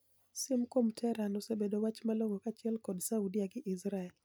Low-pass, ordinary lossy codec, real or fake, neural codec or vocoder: none; none; real; none